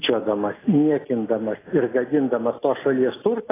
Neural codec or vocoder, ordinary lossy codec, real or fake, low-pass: none; AAC, 16 kbps; real; 3.6 kHz